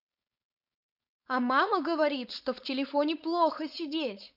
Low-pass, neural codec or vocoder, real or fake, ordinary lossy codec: 5.4 kHz; codec, 16 kHz, 4.8 kbps, FACodec; fake; none